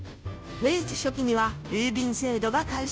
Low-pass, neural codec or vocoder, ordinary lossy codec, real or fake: none; codec, 16 kHz, 0.5 kbps, FunCodec, trained on Chinese and English, 25 frames a second; none; fake